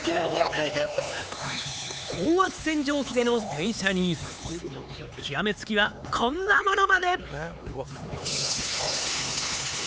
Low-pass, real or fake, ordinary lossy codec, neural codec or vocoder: none; fake; none; codec, 16 kHz, 4 kbps, X-Codec, HuBERT features, trained on LibriSpeech